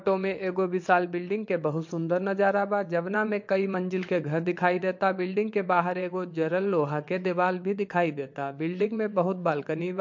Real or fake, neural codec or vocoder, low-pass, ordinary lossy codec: fake; vocoder, 22.05 kHz, 80 mel bands, WaveNeXt; 7.2 kHz; MP3, 48 kbps